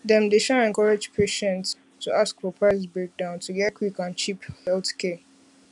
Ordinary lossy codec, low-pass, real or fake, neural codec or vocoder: none; 10.8 kHz; real; none